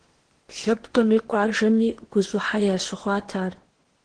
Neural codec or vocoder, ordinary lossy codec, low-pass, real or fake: codec, 16 kHz in and 24 kHz out, 0.8 kbps, FocalCodec, streaming, 65536 codes; Opus, 16 kbps; 9.9 kHz; fake